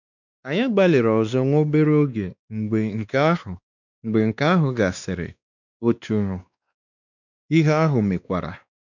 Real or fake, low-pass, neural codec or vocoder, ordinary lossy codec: fake; 7.2 kHz; codec, 16 kHz, 2 kbps, X-Codec, WavLM features, trained on Multilingual LibriSpeech; none